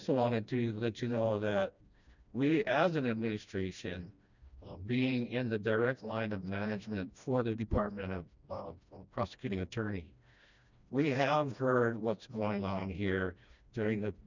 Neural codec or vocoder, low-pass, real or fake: codec, 16 kHz, 1 kbps, FreqCodec, smaller model; 7.2 kHz; fake